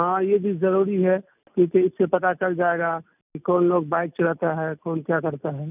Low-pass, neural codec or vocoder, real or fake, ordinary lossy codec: 3.6 kHz; none; real; none